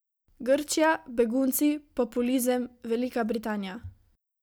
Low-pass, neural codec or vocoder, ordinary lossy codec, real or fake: none; none; none; real